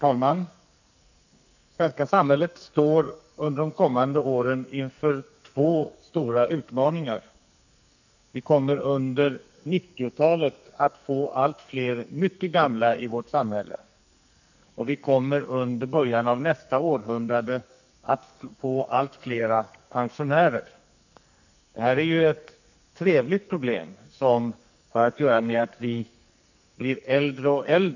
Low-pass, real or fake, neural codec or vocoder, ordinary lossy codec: 7.2 kHz; fake; codec, 44.1 kHz, 2.6 kbps, SNAC; none